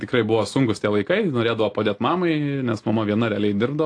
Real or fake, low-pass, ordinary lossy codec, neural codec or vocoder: real; 9.9 kHz; AAC, 48 kbps; none